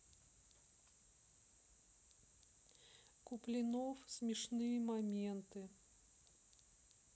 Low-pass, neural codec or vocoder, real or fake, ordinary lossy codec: none; none; real; none